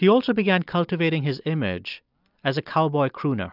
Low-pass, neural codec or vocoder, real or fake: 5.4 kHz; none; real